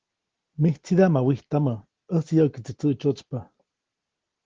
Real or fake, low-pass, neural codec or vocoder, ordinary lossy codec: real; 7.2 kHz; none; Opus, 16 kbps